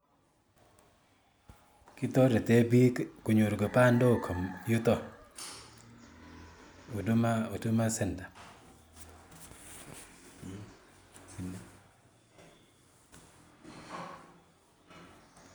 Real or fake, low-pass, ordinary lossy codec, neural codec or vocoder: real; none; none; none